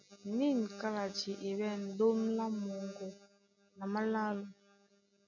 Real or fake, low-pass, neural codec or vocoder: real; 7.2 kHz; none